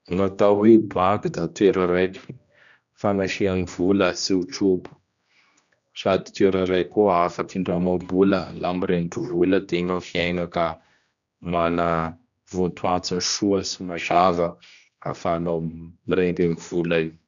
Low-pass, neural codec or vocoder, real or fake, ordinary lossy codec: 7.2 kHz; codec, 16 kHz, 1 kbps, X-Codec, HuBERT features, trained on general audio; fake; none